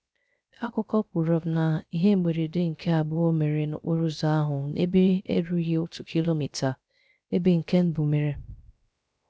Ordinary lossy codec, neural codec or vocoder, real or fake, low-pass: none; codec, 16 kHz, 0.3 kbps, FocalCodec; fake; none